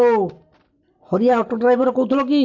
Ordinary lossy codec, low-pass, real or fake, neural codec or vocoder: MP3, 48 kbps; 7.2 kHz; real; none